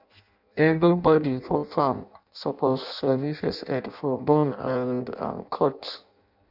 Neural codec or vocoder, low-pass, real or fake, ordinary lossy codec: codec, 16 kHz in and 24 kHz out, 0.6 kbps, FireRedTTS-2 codec; 5.4 kHz; fake; none